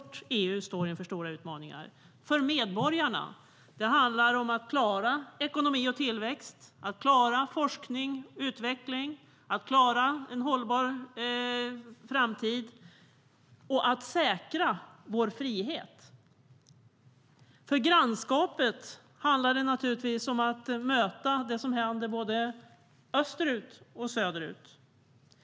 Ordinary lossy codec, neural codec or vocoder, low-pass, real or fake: none; none; none; real